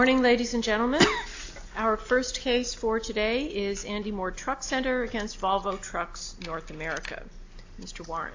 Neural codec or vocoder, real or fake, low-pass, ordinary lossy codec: none; real; 7.2 kHz; AAC, 48 kbps